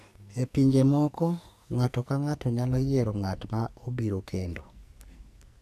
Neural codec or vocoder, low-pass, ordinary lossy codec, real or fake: codec, 44.1 kHz, 2.6 kbps, SNAC; 14.4 kHz; none; fake